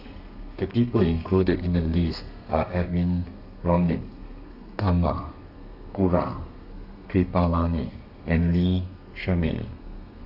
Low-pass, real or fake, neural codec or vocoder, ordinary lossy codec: 5.4 kHz; fake; codec, 32 kHz, 1.9 kbps, SNAC; none